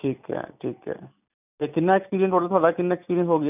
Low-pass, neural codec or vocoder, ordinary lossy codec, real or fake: 3.6 kHz; none; none; real